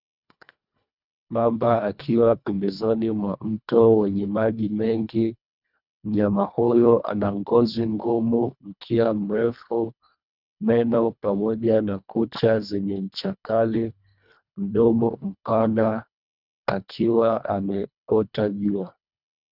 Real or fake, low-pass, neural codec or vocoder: fake; 5.4 kHz; codec, 24 kHz, 1.5 kbps, HILCodec